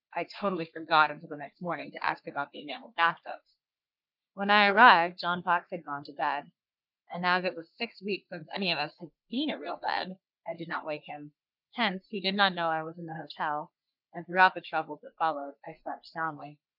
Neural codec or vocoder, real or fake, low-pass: codec, 44.1 kHz, 3.4 kbps, Pupu-Codec; fake; 5.4 kHz